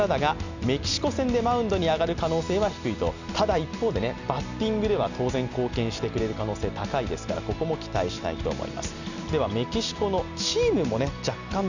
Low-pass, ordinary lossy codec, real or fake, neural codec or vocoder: 7.2 kHz; none; real; none